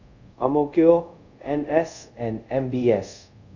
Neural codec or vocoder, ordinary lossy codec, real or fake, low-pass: codec, 24 kHz, 0.5 kbps, DualCodec; none; fake; 7.2 kHz